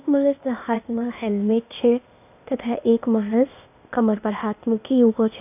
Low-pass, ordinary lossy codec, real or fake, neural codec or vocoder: 3.6 kHz; none; fake; codec, 16 kHz, 0.8 kbps, ZipCodec